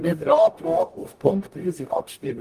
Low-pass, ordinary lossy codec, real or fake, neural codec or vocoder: 14.4 kHz; Opus, 32 kbps; fake; codec, 44.1 kHz, 0.9 kbps, DAC